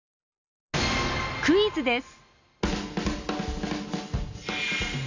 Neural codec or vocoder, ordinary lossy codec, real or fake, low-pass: none; none; real; 7.2 kHz